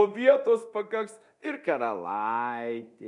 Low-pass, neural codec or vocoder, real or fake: 10.8 kHz; codec, 24 kHz, 0.9 kbps, DualCodec; fake